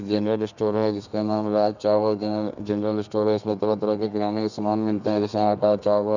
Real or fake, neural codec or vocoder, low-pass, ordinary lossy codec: fake; codec, 32 kHz, 1.9 kbps, SNAC; 7.2 kHz; none